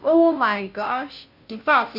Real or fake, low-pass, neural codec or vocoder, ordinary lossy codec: fake; 5.4 kHz; codec, 16 kHz, 0.5 kbps, FunCodec, trained on Chinese and English, 25 frames a second; none